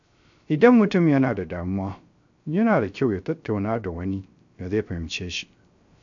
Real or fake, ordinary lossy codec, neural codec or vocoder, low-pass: fake; AAC, 64 kbps; codec, 16 kHz, 0.3 kbps, FocalCodec; 7.2 kHz